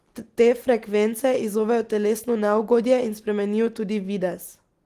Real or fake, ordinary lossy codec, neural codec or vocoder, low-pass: real; Opus, 24 kbps; none; 14.4 kHz